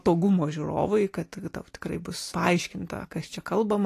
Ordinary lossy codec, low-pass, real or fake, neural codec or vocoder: AAC, 48 kbps; 14.4 kHz; real; none